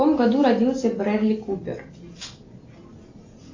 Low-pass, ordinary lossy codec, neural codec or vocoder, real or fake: 7.2 kHz; AAC, 48 kbps; none; real